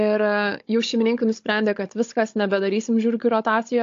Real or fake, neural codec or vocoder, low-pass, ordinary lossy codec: fake; codec, 16 kHz, 16 kbps, FunCodec, trained on Chinese and English, 50 frames a second; 7.2 kHz; AAC, 48 kbps